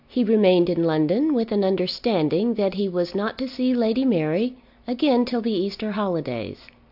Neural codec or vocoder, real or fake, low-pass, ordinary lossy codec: none; real; 5.4 kHz; AAC, 48 kbps